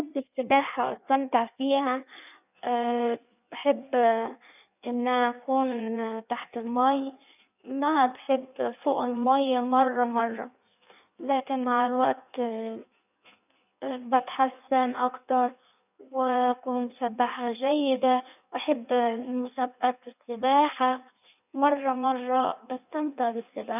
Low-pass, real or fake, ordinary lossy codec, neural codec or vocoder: 3.6 kHz; fake; none; codec, 16 kHz in and 24 kHz out, 1.1 kbps, FireRedTTS-2 codec